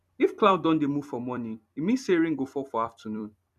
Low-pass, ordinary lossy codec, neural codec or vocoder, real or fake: 14.4 kHz; none; vocoder, 44.1 kHz, 128 mel bands every 256 samples, BigVGAN v2; fake